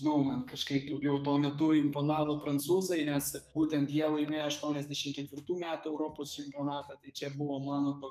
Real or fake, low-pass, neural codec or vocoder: fake; 14.4 kHz; codec, 32 kHz, 1.9 kbps, SNAC